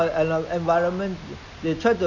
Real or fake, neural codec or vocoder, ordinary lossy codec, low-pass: real; none; none; 7.2 kHz